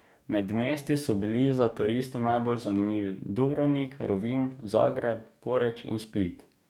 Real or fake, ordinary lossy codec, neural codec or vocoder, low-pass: fake; none; codec, 44.1 kHz, 2.6 kbps, DAC; 19.8 kHz